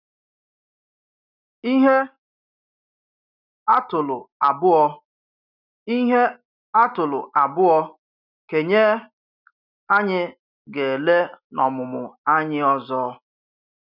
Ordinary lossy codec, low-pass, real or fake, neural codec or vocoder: none; 5.4 kHz; real; none